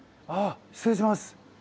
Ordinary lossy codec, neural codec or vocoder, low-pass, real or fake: none; none; none; real